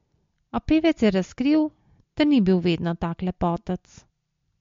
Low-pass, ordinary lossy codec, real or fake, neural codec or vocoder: 7.2 kHz; MP3, 48 kbps; real; none